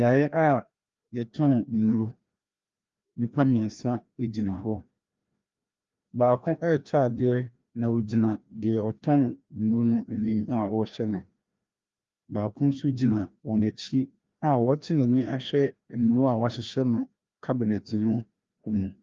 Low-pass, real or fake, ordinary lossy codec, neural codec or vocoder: 7.2 kHz; fake; Opus, 32 kbps; codec, 16 kHz, 1 kbps, FreqCodec, larger model